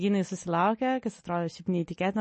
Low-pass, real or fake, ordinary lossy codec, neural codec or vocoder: 9.9 kHz; real; MP3, 32 kbps; none